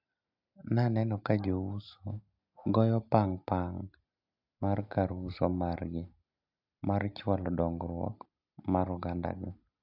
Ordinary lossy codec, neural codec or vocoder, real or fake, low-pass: AAC, 48 kbps; none; real; 5.4 kHz